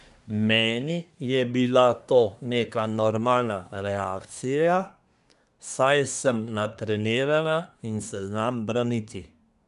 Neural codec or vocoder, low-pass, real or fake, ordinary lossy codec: codec, 24 kHz, 1 kbps, SNAC; 10.8 kHz; fake; none